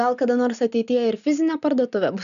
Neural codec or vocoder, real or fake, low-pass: none; real; 7.2 kHz